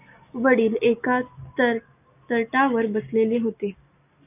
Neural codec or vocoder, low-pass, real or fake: none; 3.6 kHz; real